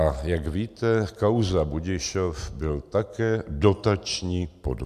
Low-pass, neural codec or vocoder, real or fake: 14.4 kHz; none; real